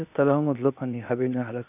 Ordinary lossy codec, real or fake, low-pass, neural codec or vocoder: none; fake; 3.6 kHz; codec, 16 kHz, 0.8 kbps, ZipCodec